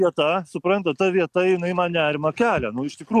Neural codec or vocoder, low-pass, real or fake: none; 14.4 kHz; real